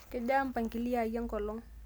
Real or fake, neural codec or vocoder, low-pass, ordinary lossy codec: real; none; none; none